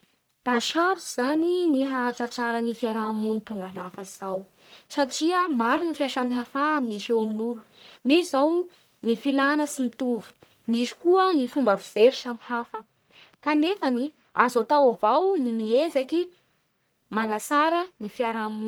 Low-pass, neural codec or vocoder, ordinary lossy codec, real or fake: none; codec, 44.1 kHz, 1.7 kbps, Pupu-Codec; none; fake